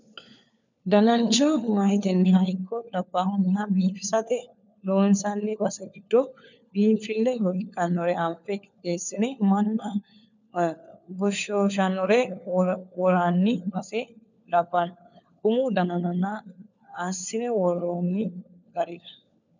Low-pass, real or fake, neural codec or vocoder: 7.2 kHz; fake; codec, 16 kHz, 4 kbps, FunCodec, trained on LibriTTS, 50 frames a second